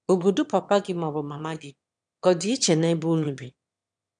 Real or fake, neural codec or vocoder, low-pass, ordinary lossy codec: fake; autoencoder, 22.05 kHz, a latent of 192 numbers a frame, VITS, trained on one speaker; 9.9 kHz; none